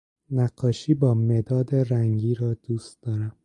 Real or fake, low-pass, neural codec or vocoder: real; 10.8 kHz; none